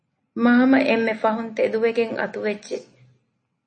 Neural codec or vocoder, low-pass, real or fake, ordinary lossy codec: none; 9.9 kHz; real; MP3, 32 kbps